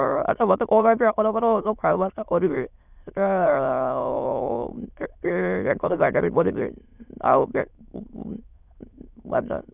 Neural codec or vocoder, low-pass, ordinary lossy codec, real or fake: autoencoder, 22.05 kHz, a latent of 192 numbers a frame, VITS, trained on many speakers; 3.6 kHz; none; fake